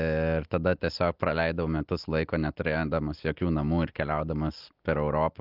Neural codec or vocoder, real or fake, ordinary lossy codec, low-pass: none; real; Opus, 32 kbps; 5.4 kHz